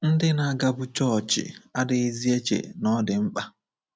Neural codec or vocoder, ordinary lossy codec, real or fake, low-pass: none; none; real; none